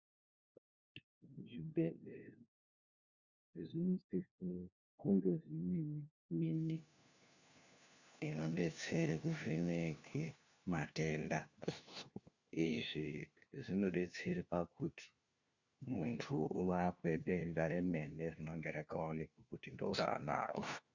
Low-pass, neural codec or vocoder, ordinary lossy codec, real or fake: 7.2 kHz; codec, 16 kHz, 1 kbps, FunCodec, trained on LibriTTS, 50 frames a second; Opus, 64 kbps; fake